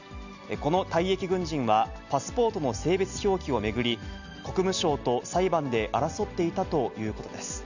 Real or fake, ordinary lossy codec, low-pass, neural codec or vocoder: real; none; 7.2 kHz; none